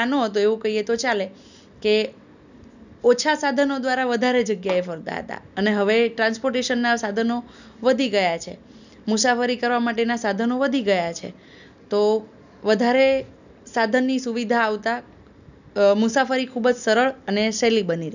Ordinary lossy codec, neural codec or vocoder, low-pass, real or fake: none; none; 7.2 kHz; real